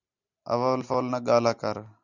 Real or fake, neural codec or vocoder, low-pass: real; none; 7.2 kHz